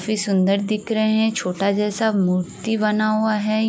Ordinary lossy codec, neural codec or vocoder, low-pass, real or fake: none; none; none; real